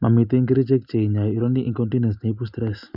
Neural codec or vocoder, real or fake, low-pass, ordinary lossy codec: none; real; 5.4 kHz; none